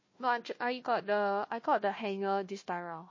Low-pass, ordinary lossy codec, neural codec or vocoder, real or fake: 7.2 kHz; MP3, 32 kbps; codec, 16 kHz, 1 kbps, FunCodec, trained on Chinese and English, 50 frames a second; fake